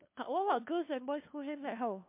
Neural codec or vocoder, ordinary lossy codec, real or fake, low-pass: codec, 16 kHz, 2 kbps, FunCodec, trained on LibriTTS, 25 frames a second; MP3, 32 kbps; fake; 3.6 kHz